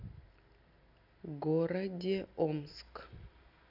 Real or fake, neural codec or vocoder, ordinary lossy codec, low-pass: real; none; none; 5.4 kHz